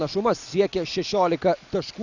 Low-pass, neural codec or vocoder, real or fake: 7.2 kHz; none; real